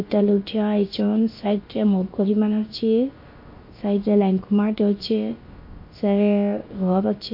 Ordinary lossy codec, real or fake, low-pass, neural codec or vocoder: MP3, 32 kbps; fake; 5.4 kHz; codec, 16 kHz, about 1 kbps, DyCAST, with the encoder's durations